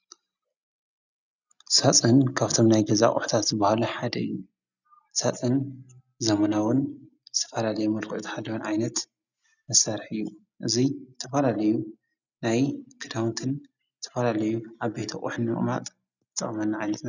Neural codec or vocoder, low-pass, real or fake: none; 7.2 kHz; real